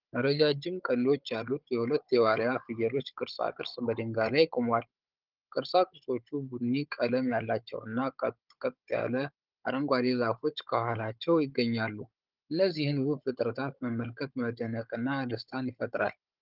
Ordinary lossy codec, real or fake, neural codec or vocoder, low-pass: Opus, 32 kbps; fake; codec, 16 kHz, 16 kbps, FunCodec, trained on Chinese and English, 50 frames a second; 5.4 kHz